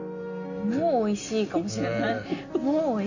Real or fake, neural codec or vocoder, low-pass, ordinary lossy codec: real; none; 7.2 kHz; none